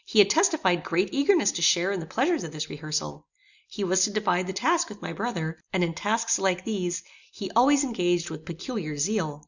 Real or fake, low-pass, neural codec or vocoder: real; 7.2 kHz; none